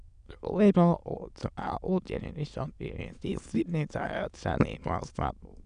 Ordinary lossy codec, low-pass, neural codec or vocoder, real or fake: none; 9.9 kHz; autoencoder, 22.05 kHz, a latent of 192 numbers a frame, VITS, trained on many speakers; fake